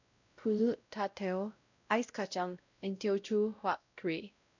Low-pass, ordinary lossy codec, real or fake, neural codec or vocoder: 7.2 kHz; none; fake; codec, 16 kHz, 0.5 kbps, X-Codec, WavLM features, trained on Multilingual LibriSpeech